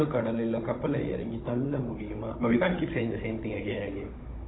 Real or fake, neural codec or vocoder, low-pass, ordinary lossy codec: fake; codec, 16 kHz, 16 kbps, FunCodec, trained on Chinese and English, 50 frames a second; 7.2 kHz; AAC, 16 kbps